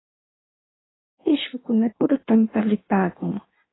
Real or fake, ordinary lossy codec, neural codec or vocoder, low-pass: fake; AAC, 16 kbps; codec, 16 kHz in and 24 kHz out, 1.1 kbps, FireRedTTS-2 codec; 7.2 kHz